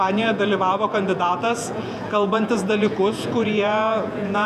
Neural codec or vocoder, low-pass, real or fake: vocoder, 48 kHz, 128 mel bands, Vocos; 14.4 kHz; fake